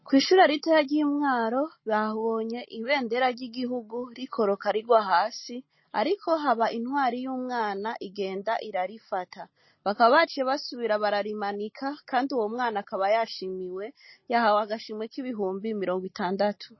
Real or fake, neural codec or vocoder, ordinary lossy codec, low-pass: real; none; MP3, 24 kbps; 7.2 kHz